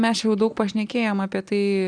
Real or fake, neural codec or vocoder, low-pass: real; none; 9.9 kHz